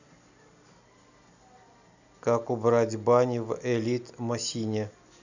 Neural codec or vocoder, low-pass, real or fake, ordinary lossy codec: none; 7.2 kHz; real; none